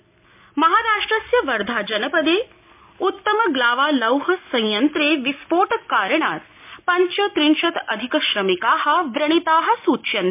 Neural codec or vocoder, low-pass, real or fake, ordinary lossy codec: none; 3.6 kHz; real; MP3, 32 kbps